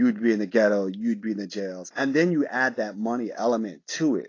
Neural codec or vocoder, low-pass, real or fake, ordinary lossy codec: none; 7.2 kHz; real; AAC, 32 kbps